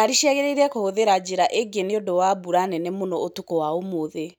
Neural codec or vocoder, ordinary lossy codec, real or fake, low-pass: none; none; real; none